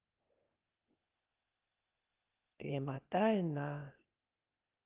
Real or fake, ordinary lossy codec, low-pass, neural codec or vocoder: fake; Opus, 32 kbps; 3.6 kHz; codec, 16 kHz, 0.8 kbps, ZipCodec